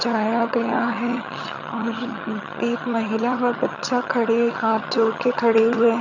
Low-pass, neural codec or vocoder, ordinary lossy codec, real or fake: 7.2 kHz; vocoder, 22.05 kHz, 80 mel bands, HiFi-GAN; none; fake